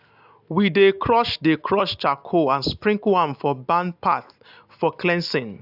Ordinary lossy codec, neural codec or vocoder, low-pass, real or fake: AAC, 48 kbps; none; 5.4 kHz; real